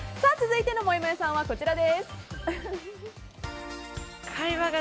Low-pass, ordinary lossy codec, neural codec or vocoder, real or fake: none; none; none; real